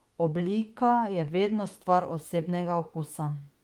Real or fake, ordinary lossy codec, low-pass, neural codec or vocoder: fake; Opus, 24 kbps; 19.8 kHz; autoencoder, 48 kHz, 32 numbers a frame, DAC-VAE, trained on Japanese speech